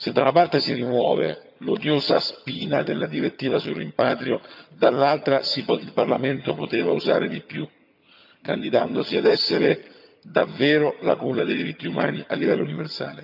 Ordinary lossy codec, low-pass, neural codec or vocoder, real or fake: none; 5.4 kHz; vocoder, 22.05 kHz, 80 mel bands, HiFi-GAN; fake